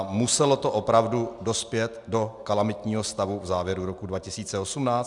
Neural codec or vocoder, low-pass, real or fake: none; 10.8 kHz; real